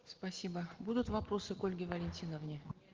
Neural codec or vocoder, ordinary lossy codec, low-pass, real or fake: none; Opus, 16 kbps; 7.2 kHz; real